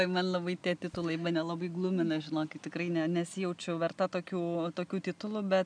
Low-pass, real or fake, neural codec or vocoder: 9.9 kHz; real; none